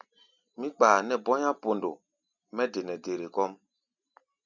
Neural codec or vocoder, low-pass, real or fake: none; 7.2 kHz; real